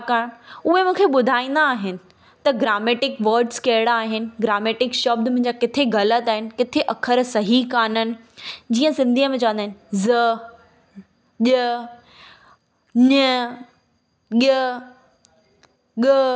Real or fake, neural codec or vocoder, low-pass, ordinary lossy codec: real; none; none; none